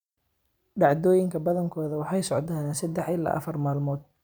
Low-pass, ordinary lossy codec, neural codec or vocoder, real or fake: none; none; vocoder, 44.1 kHz, 128 mel bands every 256 samples, BigVGAN v2; fake